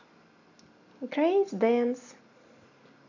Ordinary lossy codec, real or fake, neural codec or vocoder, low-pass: AAC, 48 kbps; real; none; 7.2 kHz